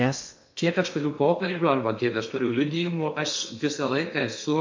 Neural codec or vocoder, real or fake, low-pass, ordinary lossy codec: codec, 16 kHz in and 24 kHz out, 0.6 kbps, FocalCodec, streaming, 2048 codes; fake; 7.2 kHz; MP3, 48 kbps